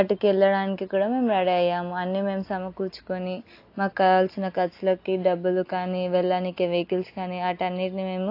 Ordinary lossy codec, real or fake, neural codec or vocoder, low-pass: AAC, 32 kbps; real; none; 5.4 kHz